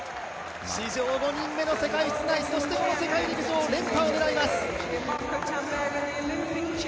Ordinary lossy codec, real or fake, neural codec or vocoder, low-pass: none; real; none; none